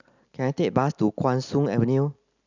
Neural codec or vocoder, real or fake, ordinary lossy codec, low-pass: none; real; none; 7.2 kHz